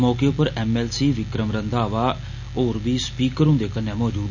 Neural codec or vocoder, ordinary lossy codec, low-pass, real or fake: none; none; 7.2 kHz; real